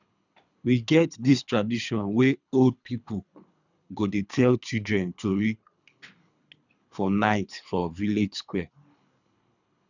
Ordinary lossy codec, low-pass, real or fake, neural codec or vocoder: none; 7.2 kHz; fake; codec, 24 kHz, 3 kbps, HILCodec